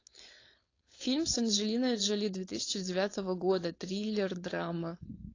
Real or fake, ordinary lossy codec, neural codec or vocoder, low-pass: fake; AAC, 32 kbps; codec, 16 kHz, 4.8 kbps, FACodec; 7.2 kHz